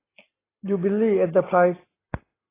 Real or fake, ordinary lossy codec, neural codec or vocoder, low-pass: real; AAC, 16 kbps; none; 3.6 kHz